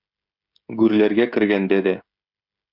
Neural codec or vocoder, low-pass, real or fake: codec, 16 kHz, 16 kbps, FreqCodec, smaller model; 5.4 kHz; fake